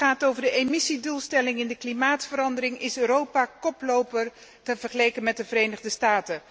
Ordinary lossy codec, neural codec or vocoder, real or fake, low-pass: none; none; real; none